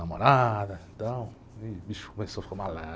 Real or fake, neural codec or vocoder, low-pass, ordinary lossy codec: real; none; none; none